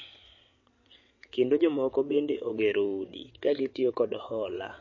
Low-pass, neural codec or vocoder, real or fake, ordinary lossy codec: 7.2 kHz; vocoder, 22.05 kHz, 80 mel bands, WaveNeXt; fake; MP3, 32 kbps